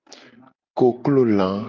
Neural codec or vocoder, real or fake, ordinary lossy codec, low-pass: none; real; Opus, 24 kbps; 7.2 kHz